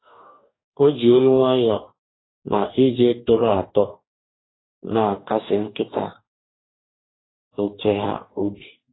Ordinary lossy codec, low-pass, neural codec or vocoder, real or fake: AAC, 16 kbps; 7.2 kHz; codec, 44.1 kHz, 2.6 kbps, DAC; fake